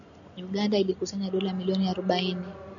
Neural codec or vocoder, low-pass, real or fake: none; 7.2 kHz; real